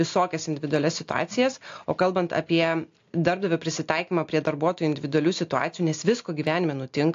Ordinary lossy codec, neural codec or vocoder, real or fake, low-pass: AAC, 48 kbps; none; real; 7.2 kHz